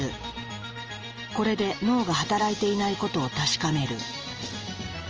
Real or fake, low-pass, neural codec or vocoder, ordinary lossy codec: real; 7.2 kHz; none; Opus, 24 kbps